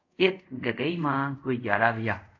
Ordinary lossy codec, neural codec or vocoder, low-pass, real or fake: AAC, 48 kbps; codec, 24 kHz, 0.5 kbps, DualCodec; 7.2 kHz; fake